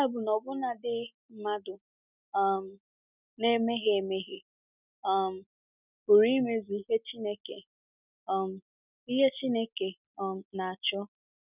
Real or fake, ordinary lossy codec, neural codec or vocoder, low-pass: real; none; none; 3.6 kHz